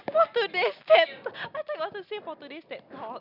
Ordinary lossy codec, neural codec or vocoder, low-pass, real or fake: none; none; 5.4 kHz; real